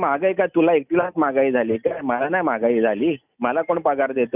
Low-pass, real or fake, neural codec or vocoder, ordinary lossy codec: 3.6 kHz; real; none; none